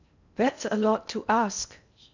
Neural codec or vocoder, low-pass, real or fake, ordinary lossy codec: codec, 16 kHz in and 24 kHz out, 0.6 kbps, FocalCodec, streaming, 2048 codes; 7.2 kHz; fake; none